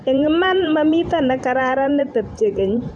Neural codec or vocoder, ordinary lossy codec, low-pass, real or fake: vocoder, 44.1 kHz, 128 mel bands every 512 samples, BigVGAN v2; none; 9.9 kHz; fake